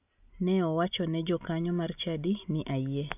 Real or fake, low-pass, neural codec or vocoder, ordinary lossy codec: real; 3.6 kHz; none; none